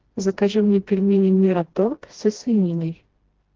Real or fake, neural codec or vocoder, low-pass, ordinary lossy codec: fake; codec, 16 kHz, 1 kbps, FreqCodec, smaller model; 7.2 kHz; Opus, 16 kbps